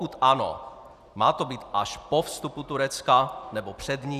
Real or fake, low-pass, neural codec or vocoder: fake; 14.4 kHz; vocoder, 44.1 kHz, 128 mel bands every 256 samples, BigVGAN v2